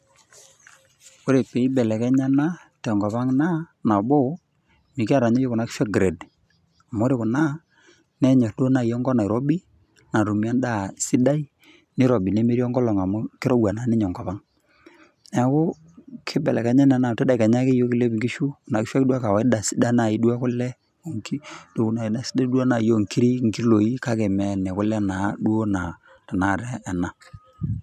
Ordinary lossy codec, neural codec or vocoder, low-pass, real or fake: none; none; 14.4 kHz; real